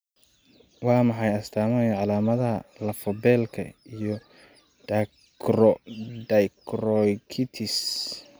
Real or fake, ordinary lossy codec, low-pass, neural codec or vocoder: real; none; none; none